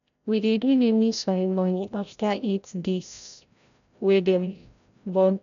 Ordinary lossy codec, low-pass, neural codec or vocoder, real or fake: none; 7.2 kHz; codec, 16 kHz, 0.5 kbps, FreqCodec, larger model; fake